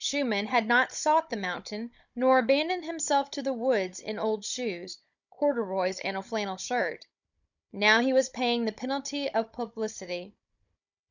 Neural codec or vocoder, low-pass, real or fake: codec, 16 kHz, 16 kbps, FunCodec, trained on Chinese and English, 50 frames a second; 7.2 kHz; fake